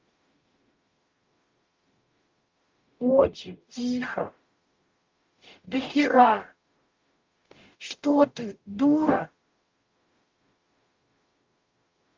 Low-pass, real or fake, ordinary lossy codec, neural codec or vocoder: 7.2 kHz; fake; Opus, 24 kbps; codec, 44.1 kHz, 0.9 kbps, DAC